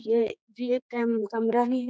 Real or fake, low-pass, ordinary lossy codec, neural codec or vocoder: fake; none; none; codec, 16 kHz, 4 kbps, X-Codec, HuBERT features, trained on general audio